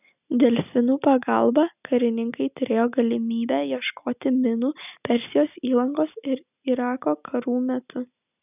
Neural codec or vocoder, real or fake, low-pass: none; real; 3.6 kHz